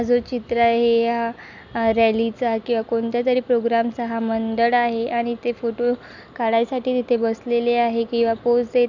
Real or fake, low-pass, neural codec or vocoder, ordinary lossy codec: real; 7.2 kHz; none; none